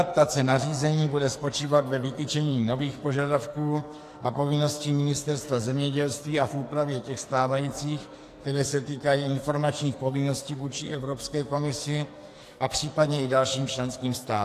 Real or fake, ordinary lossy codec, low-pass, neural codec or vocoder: fake; AAC, 64 kbps; 14.4 kHz; codec, 44.1 kHz, 2.6 kbps, SNAC